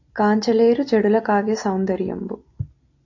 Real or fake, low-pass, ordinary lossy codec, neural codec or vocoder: real; 7.2 kHz; AAC, 48 kbps; none